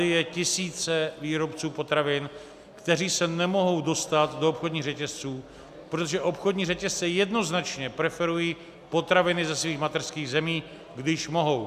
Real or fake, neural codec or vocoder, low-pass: real; none; 14.4 kHz